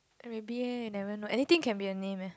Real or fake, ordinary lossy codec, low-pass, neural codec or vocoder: real; none; none; none